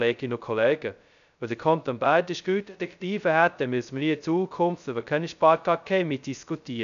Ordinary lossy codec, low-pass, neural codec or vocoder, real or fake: MP3, 96 kbps; 7.2 kHz; codec, 16 kHz, 0.2 kbps, FocalCodec; fake